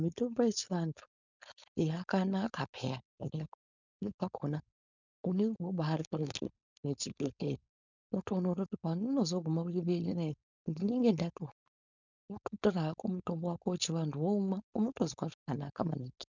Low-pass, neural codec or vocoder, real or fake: 7.2 kHz; codec, 16 kHz, 4.8 kbps, FACodec; fake